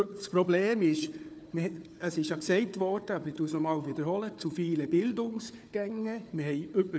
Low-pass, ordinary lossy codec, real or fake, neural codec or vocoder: none; none; fake; codec, 16 kHz, 4 kbps, FunCodec, trained on Chinese and English, 50 frames a second